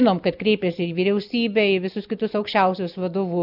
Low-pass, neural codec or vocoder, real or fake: 5.4 kHz; none; real